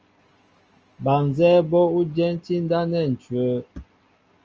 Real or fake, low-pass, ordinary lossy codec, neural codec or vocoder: real; 7.2 kHz; Opus, 24 kbps; none